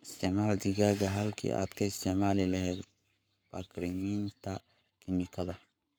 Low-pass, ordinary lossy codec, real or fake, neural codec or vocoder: none; none; fake; codec, 44.1 kHz, 7.8 kbps, Pupu-Codec